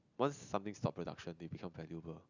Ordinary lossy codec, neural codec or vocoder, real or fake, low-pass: none; none; real; 7.2 kHz